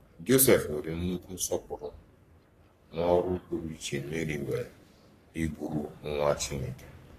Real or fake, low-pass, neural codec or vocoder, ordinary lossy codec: fake; 14.4 kHz; codec, 44.1 kHz, 3.4 kbps, Pupu-Codec; AAC, 48 kbps